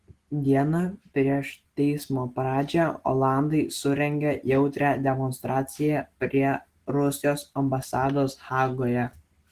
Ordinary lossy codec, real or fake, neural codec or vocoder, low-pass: Opus, 16 kbps; real; none; 14.4 kHz